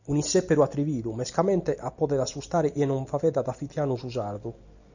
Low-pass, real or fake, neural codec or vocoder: 7.2 kHz; real; none